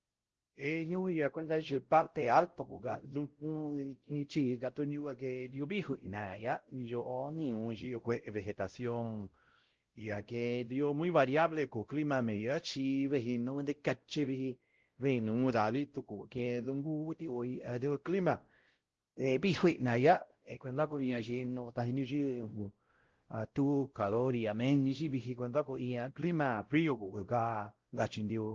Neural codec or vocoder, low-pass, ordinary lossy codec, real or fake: codec, 16 kHz, 0.5 kbps, X-Codec, WavLM features, trained on Multilingual LibriSpeech; 7.2 kHz; Opus, 16 kbps; fake